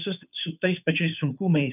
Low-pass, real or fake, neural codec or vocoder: 3.6 kHz; fake; codec, 16 kHz, 2 kbps, FunCodec, trained on Chinese and English, 25 frames a second